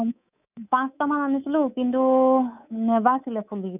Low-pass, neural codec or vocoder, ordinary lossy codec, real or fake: 3.6 kHz; codec, 24 kHz, 3.1 kbps, DualCodec; none; fake